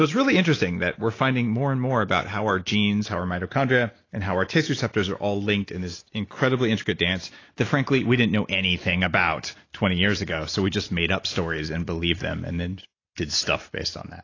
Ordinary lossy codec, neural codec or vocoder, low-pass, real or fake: AAC, 32 kbps; none; 7.2 kHz; real